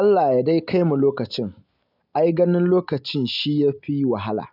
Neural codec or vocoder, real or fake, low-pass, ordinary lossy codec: none; real; 5.4 kHz; none